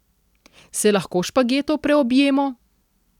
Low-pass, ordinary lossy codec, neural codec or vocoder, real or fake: 19.8 kHz; none; none; real